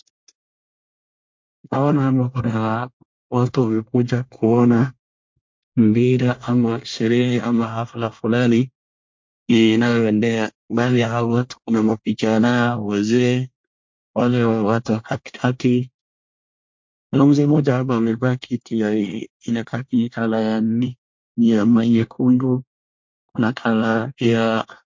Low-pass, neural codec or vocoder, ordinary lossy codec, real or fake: 7.2 kHz; codec, 24 kHz, 1 kbps, SNAC; MP3, 48 kbps; fake